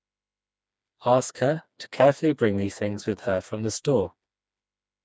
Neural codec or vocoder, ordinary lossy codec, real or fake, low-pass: codec, 16 kHz, 2 kbps, FreqCodec, smaller model; none; fake; none